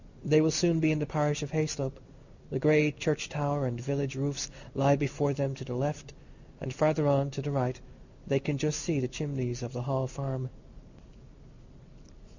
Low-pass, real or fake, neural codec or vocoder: 7.2 kHz; real; none